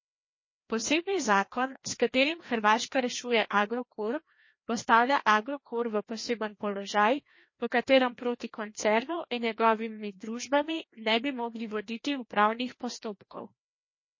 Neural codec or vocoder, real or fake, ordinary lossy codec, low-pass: codec, 16 kHz, 1 kbps, FreqCodec, larger model; fake; MP3, 32 kbps; 7.2 kHz